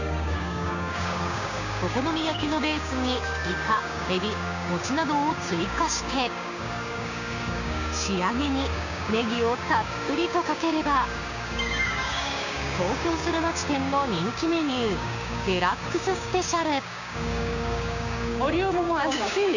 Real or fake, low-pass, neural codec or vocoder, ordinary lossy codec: fake; 7.2 kHz; codec, 16 kHz, 6 kbps, DAC; none